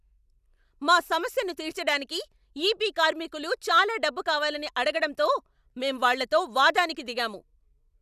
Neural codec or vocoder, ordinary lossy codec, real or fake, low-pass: none; none; real; 14.4 kHz